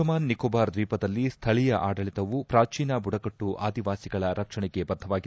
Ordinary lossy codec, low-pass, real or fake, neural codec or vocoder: none; none; real; none